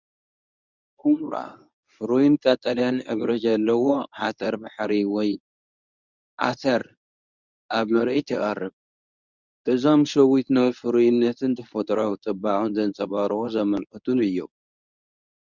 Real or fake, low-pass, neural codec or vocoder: fake; 7.2 kHz; codec, 24 kHz, 0.9 kbps, WavTokenizer, medium speech release version 1